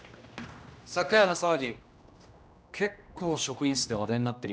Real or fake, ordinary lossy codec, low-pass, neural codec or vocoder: fake; none; none; codec, 16 kHz, 1 kbps, X-Codec, HuBERT features, trained on general audio